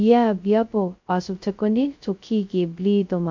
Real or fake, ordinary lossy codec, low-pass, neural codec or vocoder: fake; MP3, 48 kbps; 7.2 kHz; codec, 16 kHz, 0.2 kbps, FocalCodec